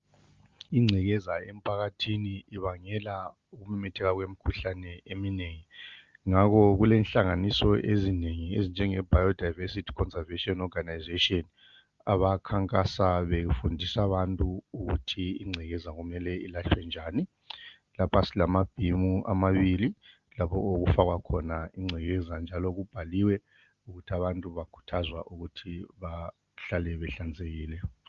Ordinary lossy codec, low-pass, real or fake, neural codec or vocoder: Opus, 32 kbps; 7.2 kHz; real; none